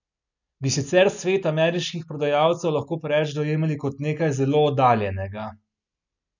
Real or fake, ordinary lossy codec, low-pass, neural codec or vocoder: real; none; 7.2 kHz; none